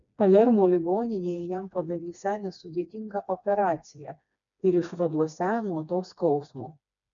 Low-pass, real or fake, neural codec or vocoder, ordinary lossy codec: 7.2 kHz; fake; codec, 16 kHz, 2 kbps, FreqCodec, smaller model; MP3, 96 kbps